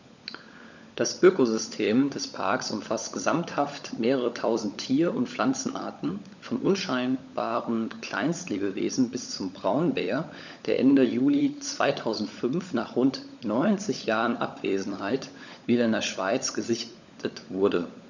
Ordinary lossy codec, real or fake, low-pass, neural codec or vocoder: none; fake; 7.2 kHz; codec, 16 kHz, 16 kbps, FunCodec, trained on LibriTTS, 50 frames a second